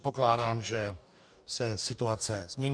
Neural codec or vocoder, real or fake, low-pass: codec, 44.1 kHz, 2.6 kbps, DAC; fake; 9.9 kHz